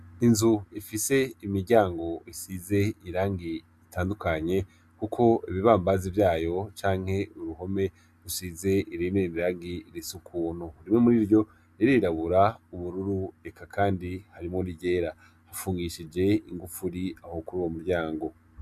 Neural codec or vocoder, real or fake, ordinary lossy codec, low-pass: none; real; Opus, 64 kbps; 14.4 kHz